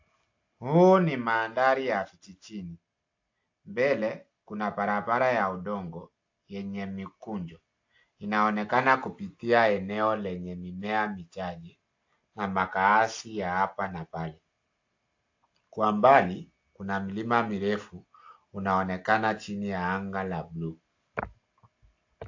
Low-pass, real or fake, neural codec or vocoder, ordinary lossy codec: 7.2 kHz; real; none; AAC, 48 kbps